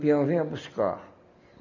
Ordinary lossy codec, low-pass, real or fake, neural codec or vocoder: none; 7.2 kHz; real; none